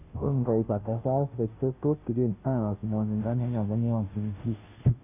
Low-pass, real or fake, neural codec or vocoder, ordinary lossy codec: 3.6 kHz; fake; codec, 16 kHz, 0.5 kbps, FunCodec, trained on Chinese and English, 25 frames a second; none